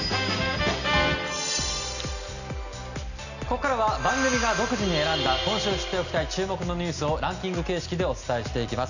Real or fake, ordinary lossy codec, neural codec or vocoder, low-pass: real; none; none; 7.2 kHz